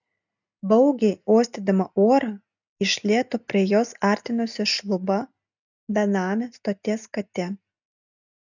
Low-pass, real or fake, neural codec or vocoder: 7.2 kHz; real; none